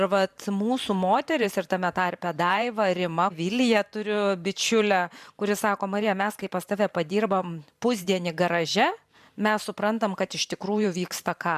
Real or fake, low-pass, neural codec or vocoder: fake; 14.4 kHz; vocoder, 44.1 kHz, 128 mel bands every 512 samples, BigVGAN v2